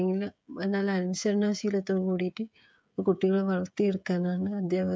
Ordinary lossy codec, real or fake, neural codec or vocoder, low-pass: none; fake; codec, 16 kHz, 16 kbps, FreqCodec, smaller model; none